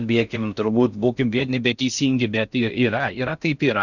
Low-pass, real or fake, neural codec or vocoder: 7.2 kHz; fake; codec, 16 kHz in and 24 kHz out, 0.6 kbps, FocalCodec, streaming, 4096 codes